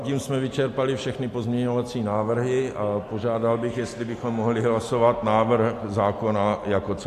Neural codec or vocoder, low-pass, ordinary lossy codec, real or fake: none; 14.4 kHz; AAC, 64 kbps; real